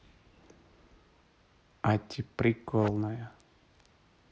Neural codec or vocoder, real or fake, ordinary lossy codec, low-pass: none; real; none; none